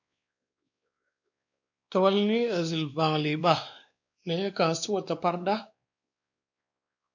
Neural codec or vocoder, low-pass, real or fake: codec, 16 kHz, 2 kbps, X-Codec, WavLM features, trained on Multilingual LibriSpeech; 7.2 kHz; fake